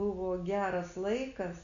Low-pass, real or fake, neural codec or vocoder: 7.2 kHz; real; none